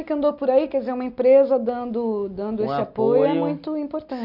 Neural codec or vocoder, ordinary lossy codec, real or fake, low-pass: none; none; real; 5.4 kHz